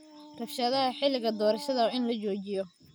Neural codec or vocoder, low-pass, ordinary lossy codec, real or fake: none; none; none; real